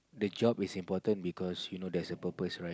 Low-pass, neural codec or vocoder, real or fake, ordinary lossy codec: none; none; real; none